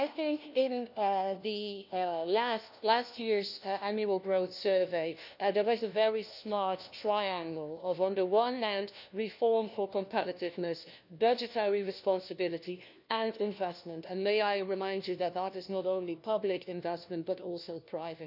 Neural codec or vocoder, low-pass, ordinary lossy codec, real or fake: codec, 16 kHz, 1 kbps, FunCodec, trained on LibriTTS, 50 frames a second; 5.4 kHz; none; fake